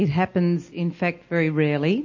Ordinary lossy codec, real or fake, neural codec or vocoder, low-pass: MP3, 32 kbps; real; none; 7.2 kHz